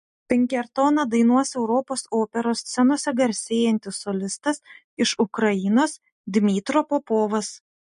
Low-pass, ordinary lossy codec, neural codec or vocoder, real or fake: 14.4 kHz; MP3, 48 kbps; none; real